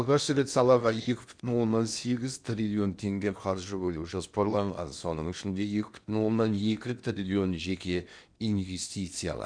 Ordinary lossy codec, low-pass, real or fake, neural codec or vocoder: none; 9.9 kHz; fake; codec, 16 kHz in and 24 kHz out, 0.8 kbps, FocalCodec, streaming, 65536 codes